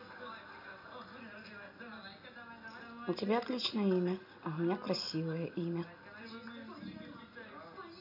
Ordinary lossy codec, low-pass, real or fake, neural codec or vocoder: none; 5.4 kHz; real; none